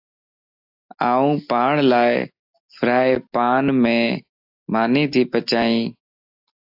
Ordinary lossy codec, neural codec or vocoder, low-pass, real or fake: AAC, 48 kbps; none; 5.4 kHz; real